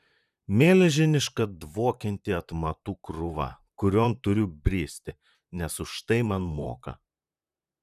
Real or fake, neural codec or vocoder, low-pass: fake; vocoder, 44.1 kHz, 128 mel bands, Pupu-Vocoder; 14.4 kHz